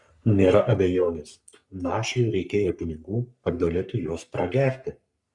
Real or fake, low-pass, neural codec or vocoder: fake; 10.8 kHz; codec, 44.1 kHz, 3.4 kbps, Pupu-Codec